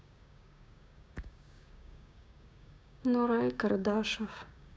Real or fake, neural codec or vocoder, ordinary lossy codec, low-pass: fake; codec, 16 kHz, 6 kbps, DAC; none; none